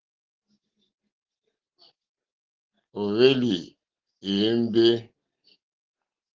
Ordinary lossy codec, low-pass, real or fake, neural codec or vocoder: Opus, 16 kbps; 7.2 kHz; real; none